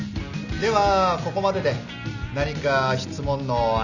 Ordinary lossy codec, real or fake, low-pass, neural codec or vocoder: none; real; 7.2 kHz; none